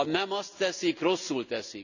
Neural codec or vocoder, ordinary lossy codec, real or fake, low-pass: none; none; real; 7.2 kHz